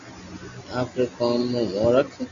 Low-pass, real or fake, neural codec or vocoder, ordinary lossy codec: 7.2 kHz; real; none; AAC, 64 kbps